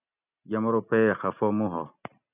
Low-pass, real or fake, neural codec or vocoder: 3.6 kHz; real; none